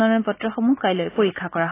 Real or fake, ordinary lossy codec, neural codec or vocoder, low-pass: real; AAC, 16 kbps; none; 3.6 kHz